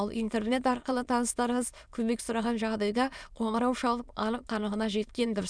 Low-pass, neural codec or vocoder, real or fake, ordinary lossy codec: none; autoencoder, 22.05 kHz, a latent of 192 numbers a frame, VITS, trained on many speakers; fake; none